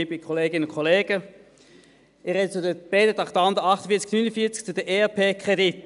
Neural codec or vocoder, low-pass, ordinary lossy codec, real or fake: none; 10.8 kHz; none; real